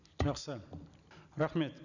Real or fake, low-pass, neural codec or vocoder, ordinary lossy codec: real; 7.2 kHz; none; none